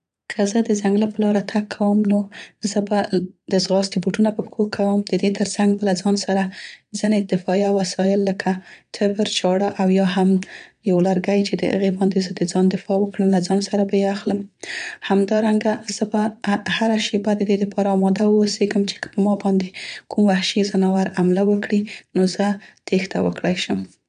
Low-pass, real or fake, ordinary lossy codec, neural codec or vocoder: 9.9 kHz; fake; none; vocoder, 22.05 kHz, 80 mel bands, Vocos